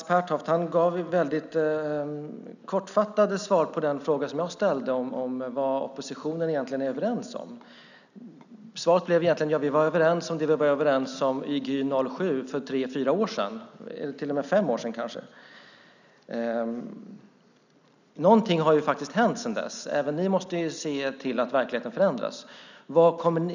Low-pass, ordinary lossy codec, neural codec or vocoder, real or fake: 7.2 kHz; none; none; real